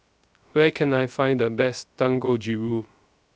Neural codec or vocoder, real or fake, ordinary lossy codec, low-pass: codec, 16 kHz, 0.3 kbps, FocalCodec; fake; none; none